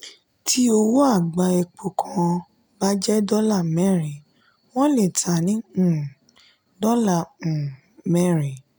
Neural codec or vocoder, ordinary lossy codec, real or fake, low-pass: none; none; real; none